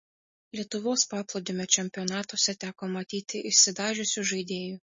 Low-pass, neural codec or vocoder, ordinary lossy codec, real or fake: 7.2 kHz; none; MP3, 32 kbps; real